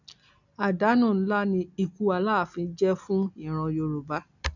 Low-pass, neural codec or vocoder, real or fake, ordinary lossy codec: 7.2 kHz; none; real; none